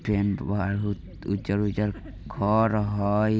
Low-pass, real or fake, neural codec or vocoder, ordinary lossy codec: none; real; none; none